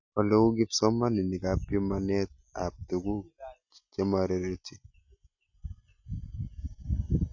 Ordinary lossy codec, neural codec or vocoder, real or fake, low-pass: none; none; real; 7.2 kHz